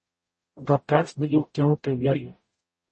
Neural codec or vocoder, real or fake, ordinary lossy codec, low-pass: codec, 44.1 kHz, 0.9 kbps, DAC; fake; MP3, 32 kbps; 10.8 kHz